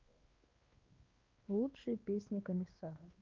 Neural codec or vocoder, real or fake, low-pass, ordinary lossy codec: codec, 16 kHz, 2 kbps, X-Codec, WavLM features, trained on Multilingual LibriSpeech; fake; 7.2 kHz; Opus, 24 kbps